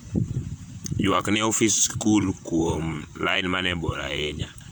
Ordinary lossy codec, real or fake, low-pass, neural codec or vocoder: none; fake; none; vocoder, 44.1 kHz, 128 mel bands, Pupu-Vocoder